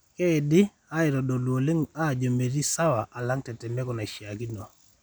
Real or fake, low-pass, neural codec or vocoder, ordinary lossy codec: real; none; none; none